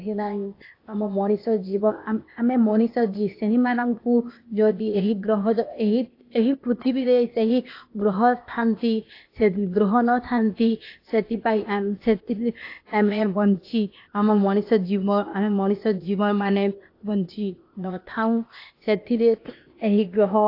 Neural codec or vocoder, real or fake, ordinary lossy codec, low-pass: codec, 16 kHz, 0.8 kbps, ZipCodec; fake; AAC, 32 kbps; 5.4 kHz